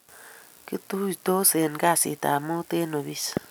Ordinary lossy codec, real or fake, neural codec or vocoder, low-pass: none; real; none; none